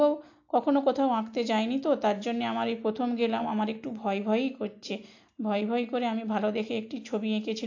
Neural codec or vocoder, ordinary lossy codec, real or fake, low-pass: none; AAC, 48 kbps; real; 7.2 kHz